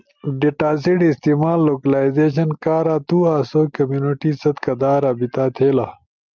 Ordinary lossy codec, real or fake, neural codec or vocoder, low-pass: Opus, 24 kbps; real; none; 7.2 kHz